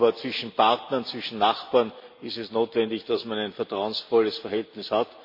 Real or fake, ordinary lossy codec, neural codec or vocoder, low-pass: real; MP3, 32 kbps; none; 5.4 kHz